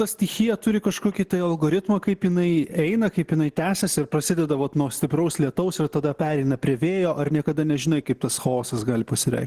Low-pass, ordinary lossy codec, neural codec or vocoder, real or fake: 14.4 kHz; Opus, 16 kbps; none; real